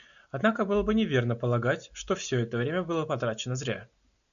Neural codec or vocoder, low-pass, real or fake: none; 7.2 kHz; real